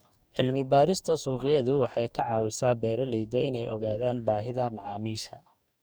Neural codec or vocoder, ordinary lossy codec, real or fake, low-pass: codec, 44.1 kHz, 2.6 kbps, DAC; none; fake; none